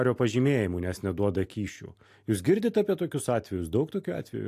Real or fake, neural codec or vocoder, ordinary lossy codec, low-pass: real; none; AAC, 64 kbps; 14.4 kHz